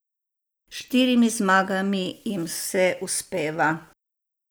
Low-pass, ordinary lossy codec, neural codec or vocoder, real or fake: none; none; none; real